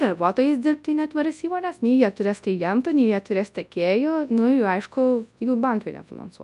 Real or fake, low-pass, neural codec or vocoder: fake; 10.8 kHz; codec, 24 kHz, 0.9 kbps, WavTokenizer, large speech release